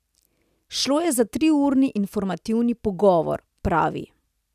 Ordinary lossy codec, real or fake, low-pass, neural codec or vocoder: none; real; 14.4 kHz; none